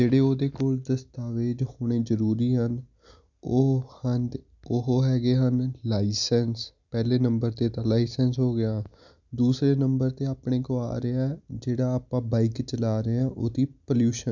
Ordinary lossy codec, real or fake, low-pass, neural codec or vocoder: none; real; 7.2 kHz; none